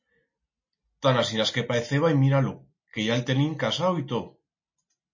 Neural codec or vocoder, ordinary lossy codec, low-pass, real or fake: none; MP3, 32 kbps; 7.2 kHz; real